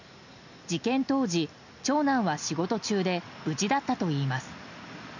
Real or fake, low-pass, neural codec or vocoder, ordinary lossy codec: real; 7.2 kHz; none; none